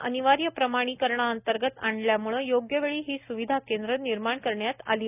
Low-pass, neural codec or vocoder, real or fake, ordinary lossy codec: 3.6 kHz; none; real; none